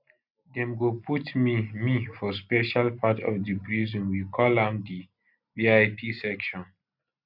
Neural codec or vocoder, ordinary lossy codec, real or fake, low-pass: none; none; real; 5.4 kHz